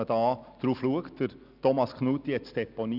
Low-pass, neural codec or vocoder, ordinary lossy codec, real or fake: 5.4 kHz; none; none; real